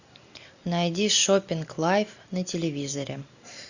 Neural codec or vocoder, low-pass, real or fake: none; 7.2 kHz; real